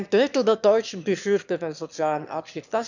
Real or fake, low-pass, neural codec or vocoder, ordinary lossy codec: fake; 7.2 kHz; autoencoder, 22.05 kHz, a latent of 192 numbers a frame, VITS, trained on one speaker; none